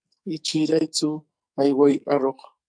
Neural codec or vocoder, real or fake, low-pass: codec, 44.1 kHz, 2.6 kbps, SNAC; fake; 9.9 kHz